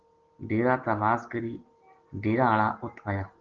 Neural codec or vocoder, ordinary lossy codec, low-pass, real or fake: none; Opus, 16 kbps; 7.2 kHz; real